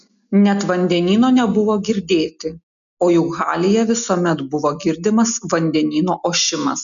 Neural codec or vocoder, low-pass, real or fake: none; 7.2 kHz; real